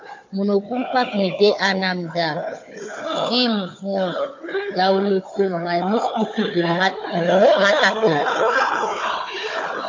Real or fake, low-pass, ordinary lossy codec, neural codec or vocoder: fake; 7.2 kHz; MP3, 48 kbps; codec, 16 kHz, 4 kbps, FunCodec, trained on Chinese and English, 50 frames a second